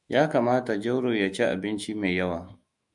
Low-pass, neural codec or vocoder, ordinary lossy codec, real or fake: 10.8 kHz; autoencoder, 48 kHz, 128 numbers a frame, DAC-VAE, trained on Japanese speech; MP3, 96 kbps; fake